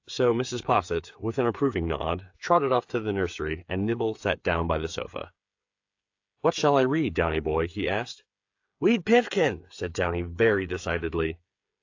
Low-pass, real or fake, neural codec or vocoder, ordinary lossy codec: 7.2 kHz; fake; codec, 16 kHz, 8 kbps, FreqCodec, smaller model; AAC, 48 kbps